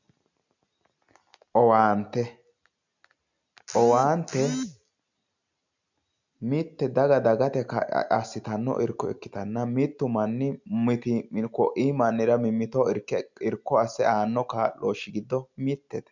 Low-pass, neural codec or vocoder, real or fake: 7.2 kHz; none; real